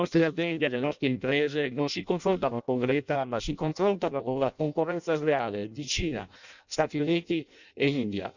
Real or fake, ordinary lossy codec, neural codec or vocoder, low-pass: fake; none; codec, 16 kHz in and 24 kHz out, 0.6 kbps, FireRedTTS-2 codec; 7.2 kHz